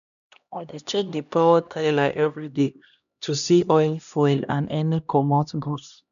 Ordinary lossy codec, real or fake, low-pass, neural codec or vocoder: none; fake; 7.2 kHz; codec, 16 kHz, 1 kbps, X-Codec, HuBERT features, trained on LibriSpeech